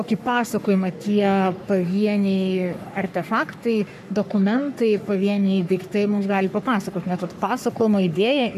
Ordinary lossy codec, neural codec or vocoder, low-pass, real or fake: MP3, 96 kbps; codec, 44.1 kHz, 3.4 kbps, Pupu-Codec; 14.4 kHz; fake